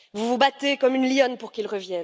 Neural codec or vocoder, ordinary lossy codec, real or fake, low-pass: none; none; real; none